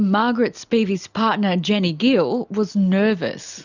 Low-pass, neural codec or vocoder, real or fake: 7.2 kHz; none; real